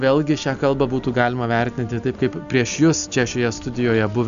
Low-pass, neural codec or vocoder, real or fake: 7.2 kHz; none; real